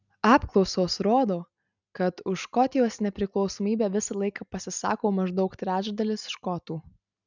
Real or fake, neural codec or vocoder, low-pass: real; none; 7.2 kHz